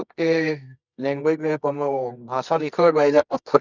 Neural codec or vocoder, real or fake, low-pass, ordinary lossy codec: codec, 24 kHz, 0.9 kbps, WavTokenizer, medium music audio release; fake; 7.2 kHz; none